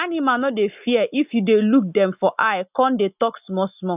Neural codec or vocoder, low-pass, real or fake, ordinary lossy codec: none; 3.6 kHz; real; none